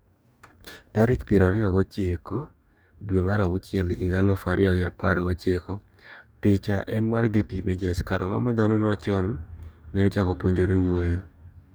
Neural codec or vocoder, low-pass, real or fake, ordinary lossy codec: codec, 44.1 kHz, 2.6 kbps, DAC; none; fake; none